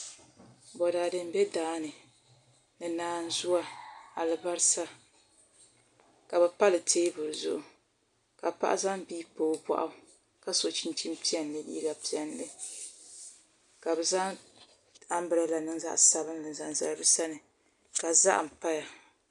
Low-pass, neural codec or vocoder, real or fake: 10.8 kHz; none; real